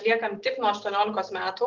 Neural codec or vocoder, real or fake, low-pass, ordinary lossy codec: none; real; 7.2 kHz; Opus, 16 kbps